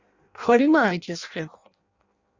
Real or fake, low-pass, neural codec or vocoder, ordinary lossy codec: fake; 7.2 kHz; codec, 16 kHz in and 24 kHz out, 0.6 kbps, FireRedTTS-2 codec; Opus, 64 kbps